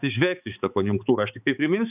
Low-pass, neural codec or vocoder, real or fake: 3.6 kHz; codec, 16 kHz, 4 kbps, X-Codec, HuBERT features, trained on balanced general audio; fake